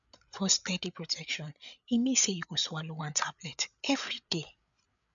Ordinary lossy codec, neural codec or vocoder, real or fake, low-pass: none; codec, 16 kHz, 8 kbps, FreqCodec, larger model; fake; 7.2 kHz